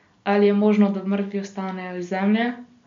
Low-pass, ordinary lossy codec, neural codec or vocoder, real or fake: 7.2 kHz; MP3, 48 kbps; none; real